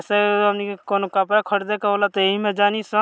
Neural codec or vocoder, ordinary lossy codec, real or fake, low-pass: none; none; real; none